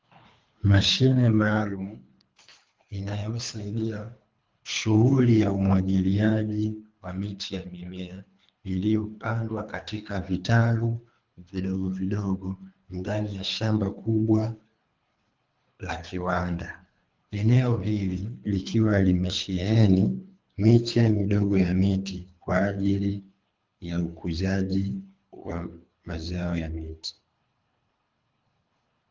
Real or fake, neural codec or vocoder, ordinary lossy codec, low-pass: fake; codec, 24 kHz, 3 kbps, HILCodec; Opus, 32 kbps; 7.2 kHz